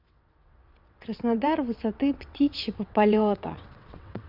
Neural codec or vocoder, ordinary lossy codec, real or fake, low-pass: vocoder, 22.05 kHz, 80 mel bands, WaveNeXt; MP3, 48 kbps; fake; 5.4 kHz